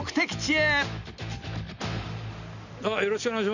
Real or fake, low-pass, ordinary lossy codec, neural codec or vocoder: real; 7.2 kHz; none; none